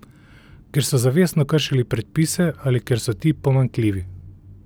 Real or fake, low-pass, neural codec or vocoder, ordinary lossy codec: real; none; none; none